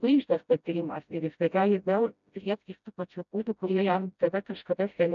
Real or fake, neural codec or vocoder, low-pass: fake; codec, 16 kHz, 0.5 kbps, FreqCodec, smaller model; 7.2 kHz